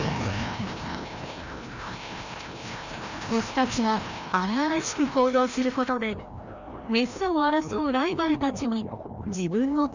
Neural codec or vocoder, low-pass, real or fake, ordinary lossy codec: codec, 16 kHz, 1 kbps, FreqCodec, larger model; 7.2 kHz; fake; Opus, 64 kbps